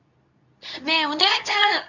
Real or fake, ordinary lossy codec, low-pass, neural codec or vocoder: fake; none; 7.2 kHz; codec, 24 kHz, 0.9 kbps, WavTokenizer, medium speech release version 2